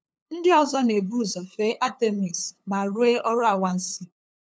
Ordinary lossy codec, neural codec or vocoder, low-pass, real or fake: none; codec, 16 kHz, 8 kbps, FunCodec, trained on LibriTTS, 25 frames a second; none; fake